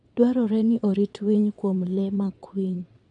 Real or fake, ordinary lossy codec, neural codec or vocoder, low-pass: fake; none; vocoder, 22.05 kHz, 80 mel bands, WaveNeXt; 9.9 kHz